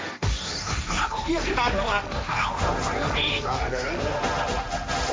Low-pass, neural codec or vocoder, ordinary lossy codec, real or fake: none; codec, 16 kHz, 1.1 kbps, Voila-Tokenizer; none; fake